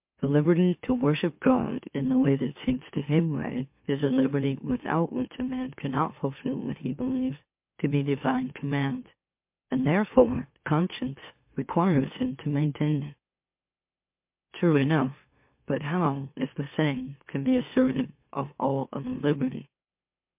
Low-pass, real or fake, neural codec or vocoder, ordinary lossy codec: 3.6 kHz; fake; autoencoder, 44.1 kHz, a latent of 192 numbers a frame, MeloTTS; MP3, 32 kbps